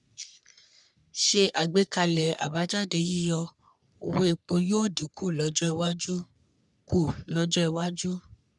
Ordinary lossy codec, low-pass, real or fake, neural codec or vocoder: none; 10.8 kHz; fake; codec, 44.1 kHz, 3.4 kbps, Pupu-Codec